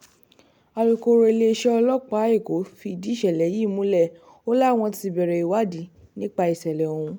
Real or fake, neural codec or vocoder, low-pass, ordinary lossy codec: real; none; 19.8 kHz; none